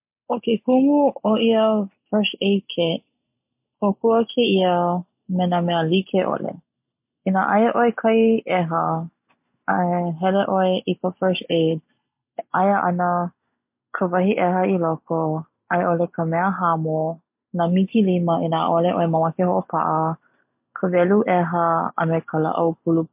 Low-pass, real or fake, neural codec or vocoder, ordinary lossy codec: 3.6 kHz; real; none; MP3, 32 kbps